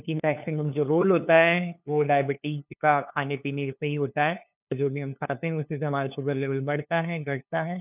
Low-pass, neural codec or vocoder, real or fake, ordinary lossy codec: 3.6 kHz; codec, 16 kHz, 2 kbps, FunCodec, trained on LibriTTS, 25 frames a second; fake; none